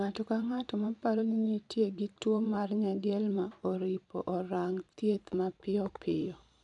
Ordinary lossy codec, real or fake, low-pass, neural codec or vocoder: none; fake; 10.8 kHz; vocoder, 44.1 kHz, 128 mel bands every 512 samples, BigVGAN v2